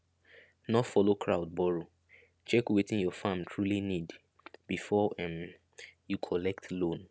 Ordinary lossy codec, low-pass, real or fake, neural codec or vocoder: none; none; real; none